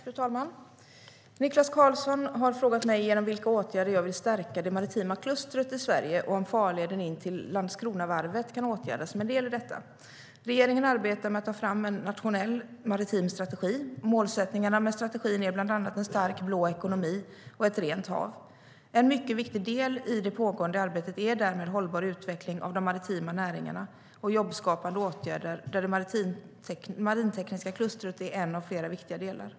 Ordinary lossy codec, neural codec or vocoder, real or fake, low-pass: none; none; real; none